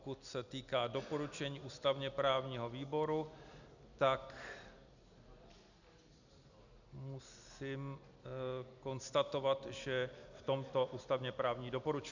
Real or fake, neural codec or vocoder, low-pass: real; none; 7.2 kHz